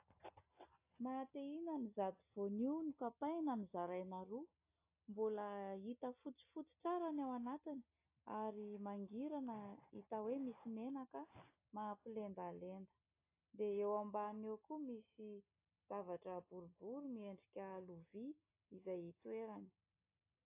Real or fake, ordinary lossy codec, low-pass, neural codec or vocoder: real; AAC, 24 kbps; 3.6 kHz; none